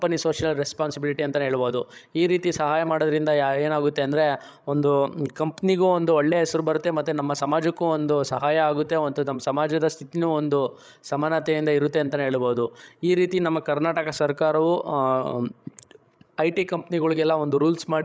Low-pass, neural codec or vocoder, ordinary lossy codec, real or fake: none; codec, 16 kHz, 16 kbps, FreqCodec, larger model; none; fake